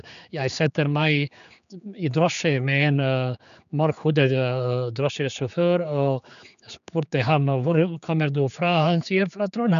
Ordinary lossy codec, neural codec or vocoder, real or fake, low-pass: none; codec, 16 kHz, 4 kbps, X-Codec, HuBERT features, trained on general audio; fake; 7.2 kHz